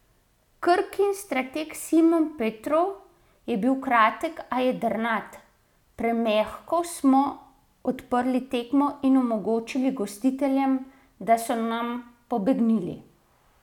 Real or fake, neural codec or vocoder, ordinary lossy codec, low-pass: real; none; none; 19.8 kHz